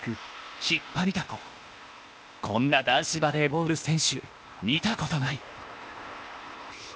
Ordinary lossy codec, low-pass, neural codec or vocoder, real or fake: none; none; codec, 16 kHz, 0.8 kbps, ZipCodec; fake